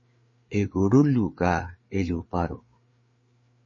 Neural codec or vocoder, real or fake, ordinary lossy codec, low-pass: codec, 16 kHz, 4 kbps, FreqCodec, larger model; fake; MP3, 32 kbps; 7.2 kHz